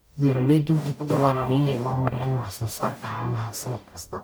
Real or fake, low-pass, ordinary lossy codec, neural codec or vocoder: fake; none; none; codec, 44.1 kHz, 0.9 kbps, DAC